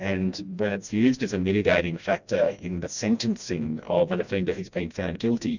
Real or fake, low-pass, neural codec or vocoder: fake; 7.2 kHz; codec, 16 kHz, 1 kbps, FreqCodec, smaller model